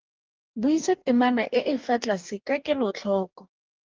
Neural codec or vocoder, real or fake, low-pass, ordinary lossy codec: codec, 44.1 kHz, 2.6 kbps, DAC; fake; 7.2 kHz; Opus, 24 kbps